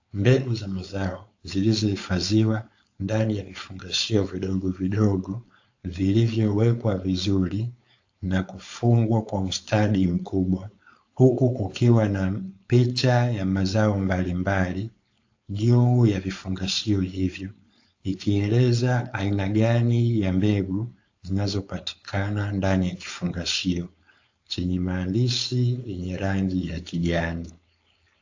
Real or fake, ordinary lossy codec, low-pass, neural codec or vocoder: fake; AAC, 48 kbps; 7.2 kHz; codec, 16 kHz, 4.8 kbps, FACodec